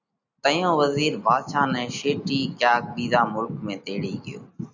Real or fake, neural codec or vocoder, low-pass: real; none; 7.2 kHz